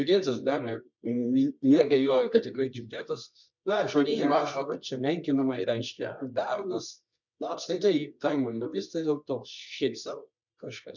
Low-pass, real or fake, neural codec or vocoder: 7.2 kHz; fake; codec, 24 kHz, 0.9 kbps, WavTokenizer, medium music audio release